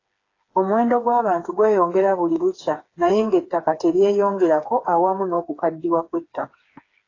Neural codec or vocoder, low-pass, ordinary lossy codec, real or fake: codec, 16 kHz, 4 kbps, FreqCodec, smaller model; 7.2 kHz; AAC, 32 kbps; fake